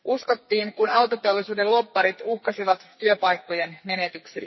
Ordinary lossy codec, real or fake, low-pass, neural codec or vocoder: MP3, 24 kbps; fake; 7.2 kHz; codec, 44.1 kHz, 2.6 kbps, SNAC